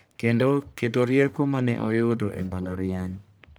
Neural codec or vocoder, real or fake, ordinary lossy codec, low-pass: codec, 44.1 kHz, 1.7 kbps, Pupu-Codec; fake; none; none